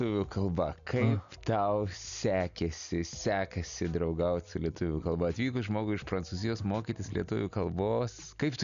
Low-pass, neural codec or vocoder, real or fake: 7.2 kHz; none; real